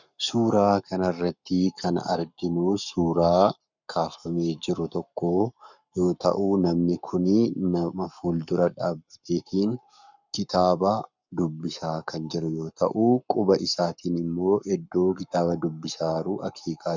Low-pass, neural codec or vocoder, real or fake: 7.2 kHz; codec, 44.1 kHz, 7.8 kbps, Pupu-Codec; fake